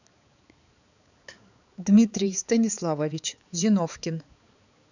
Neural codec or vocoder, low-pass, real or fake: codec, 16 kHz, 4 kbps, X-Codec, HuBERT features, trained on balanced general audio; 7.2 kHz; fake